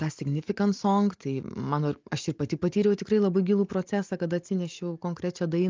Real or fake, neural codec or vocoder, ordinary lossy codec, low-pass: real; none; Opus, 24 kbps; 7.2 kHz